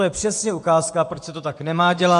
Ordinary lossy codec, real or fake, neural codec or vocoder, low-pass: AAC, 64 kbps; fake; vocoder, 24 kHz, 100 mel bands, Vocos; 10.8 kHz